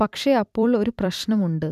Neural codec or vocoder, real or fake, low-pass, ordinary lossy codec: vocoder, 44.1 kHz, 128 mel bands every 256 samples, BigVGAN v2; fake; 14.4 kHz; none